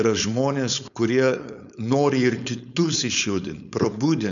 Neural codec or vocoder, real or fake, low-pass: codec, 16 kHz, 4.8 kbps, FACodec; fake; 7.2 kHz